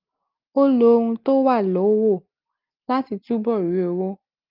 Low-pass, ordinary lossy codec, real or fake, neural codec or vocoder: 5.4 kHz; Opus, 32 kbps; real; none